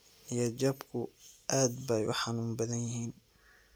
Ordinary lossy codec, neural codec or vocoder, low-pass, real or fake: none; none; none; real